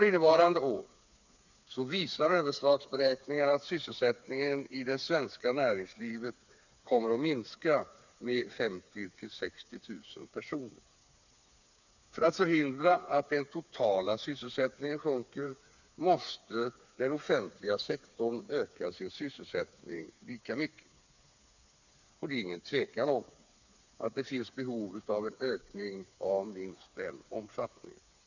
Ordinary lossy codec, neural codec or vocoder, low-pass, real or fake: none; codec, 16 kHz, 4 kbps, FreqCodec, smaller model; 7.2 kHz; fake